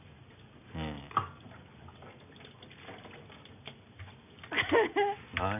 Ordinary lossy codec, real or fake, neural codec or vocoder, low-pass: none; real; none; 3.6 kHz